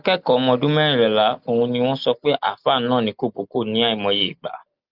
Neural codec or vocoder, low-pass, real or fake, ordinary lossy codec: none; 5.4 kHz; real; Opus, 24 kbps